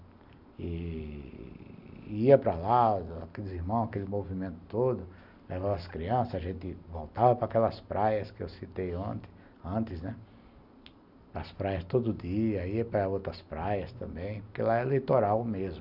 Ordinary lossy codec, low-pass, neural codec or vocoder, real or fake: none; 5.4 kHz; none; real